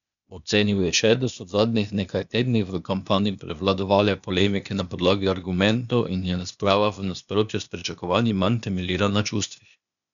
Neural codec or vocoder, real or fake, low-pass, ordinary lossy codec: codec, 16 kHz, 0.8 kbps, ZipCodec; fake; 7.2 kHz; none